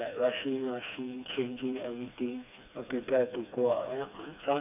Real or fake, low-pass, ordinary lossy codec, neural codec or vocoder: fake; 3.6 kHz; none; codec, 16 kHz, 2 kbps, FreqCodec, smaller model